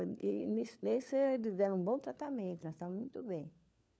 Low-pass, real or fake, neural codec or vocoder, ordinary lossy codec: none; fake; codec, 16 kHz, 4 kbps, FunCodec, trained on LibriTTS, 50 frames a second; none